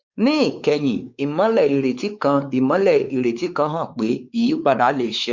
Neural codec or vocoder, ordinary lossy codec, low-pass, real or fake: codec, 16 kHz, 4 kbps, X-Codec, WavLM features, trained on Multilingual LibriSpeech; Opus, 64 kbps; 7.2 kHz; fake